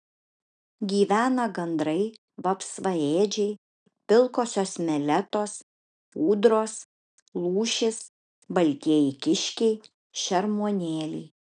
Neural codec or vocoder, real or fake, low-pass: none; real; 9.9 kHz